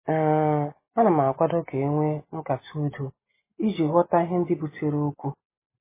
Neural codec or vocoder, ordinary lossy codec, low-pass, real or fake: none; MP3, 16 kbps; 3.6 kHz; real